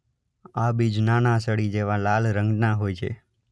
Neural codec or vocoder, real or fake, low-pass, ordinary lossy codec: none; real; none; none